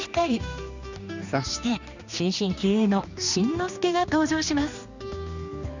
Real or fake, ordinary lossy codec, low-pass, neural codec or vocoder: fake; none; 7.2 kHz; codec, 16 kHz, 2 kbps, X-Codec, HuBERT features, trained on general audio